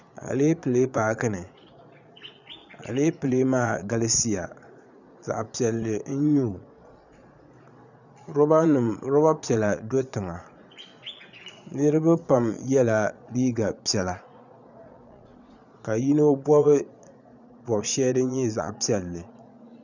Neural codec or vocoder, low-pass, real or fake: vocoder, 22.05 kHz, 80 mel bands, Vocos; 7.2 kHz; fake